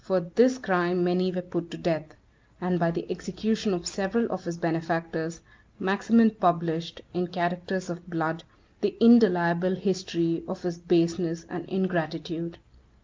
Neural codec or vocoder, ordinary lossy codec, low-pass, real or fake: none; Opus, 32 kbps; 7.2 kHz; real